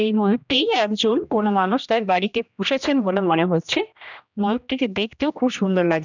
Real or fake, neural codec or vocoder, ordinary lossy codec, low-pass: fake; codec, 16 kHz, 1 kbps, X-Codec, HuBERT features, trained on general audio; none; 7.2 kHz